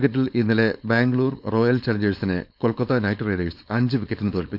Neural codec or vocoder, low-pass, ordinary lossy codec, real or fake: codec, 16 kHz, 4.8 kbps, FACodec; 5.4 kHz; none; fake